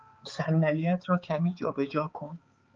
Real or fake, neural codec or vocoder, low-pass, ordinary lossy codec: fake; codec, 16 kHz, 4 kbps, X-Codec, HuBERT features, trained on balanced general audio; 7.2 kHz; Opus, 24 kbps